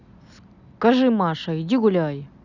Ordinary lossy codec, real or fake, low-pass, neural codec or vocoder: none; real; 7.2 kHz; none